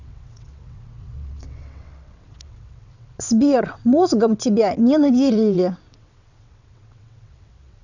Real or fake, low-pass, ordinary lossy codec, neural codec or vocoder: fake; 7.2 kHz; none; vocoder, 22.05 kHz, 80 mel bands, WaveNeXt